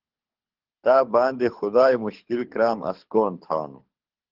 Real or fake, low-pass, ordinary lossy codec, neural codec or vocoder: fake; 5.4 kHz; Opus, 16 kbps; codec, 24 kHz, 6 kbps, HILCodec